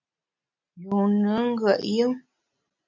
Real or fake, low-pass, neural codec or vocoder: real; 7.2 kHz; none